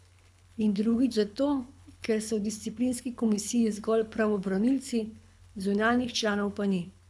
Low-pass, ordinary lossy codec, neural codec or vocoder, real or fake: none; none; codec, 24 kHz, 6 kbps, HILCodec; fake